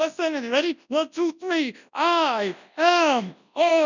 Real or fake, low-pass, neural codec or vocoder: fake; 7.2 kHz; codec, 24 kHz, 0.9 kbps, WavTokenizer, large speech release